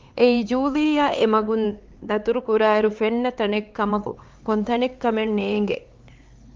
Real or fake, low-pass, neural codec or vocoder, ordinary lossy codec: fake; 7.2 kHz; codec, 16 kHz, 4 kbps, X-Codec, HuBERT features, trained on LibriSpeech; Opus, 32 kbps